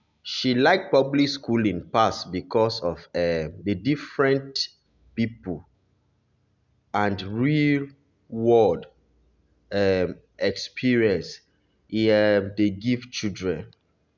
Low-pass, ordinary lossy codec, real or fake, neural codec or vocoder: 7.2 kHz; none; real; none